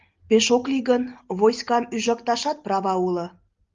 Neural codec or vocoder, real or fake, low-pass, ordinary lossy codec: none; real; 7.2 kHz; Opus, 32 kbps